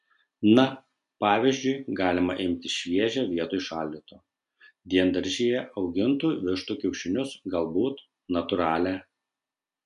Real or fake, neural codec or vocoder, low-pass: real; none; 10.8 kHz